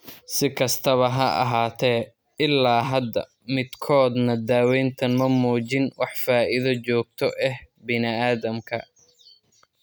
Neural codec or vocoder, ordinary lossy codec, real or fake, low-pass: none; none; real; none